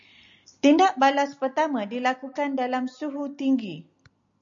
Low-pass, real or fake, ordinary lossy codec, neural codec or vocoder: 7.2 kHz; real; MP3, 64 kbps; none